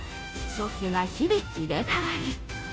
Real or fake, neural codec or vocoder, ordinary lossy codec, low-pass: fake; codec, 16 kHz, 0.5 kbps, FunCodec, trained on Chinese and English, 25 frames a second; none; none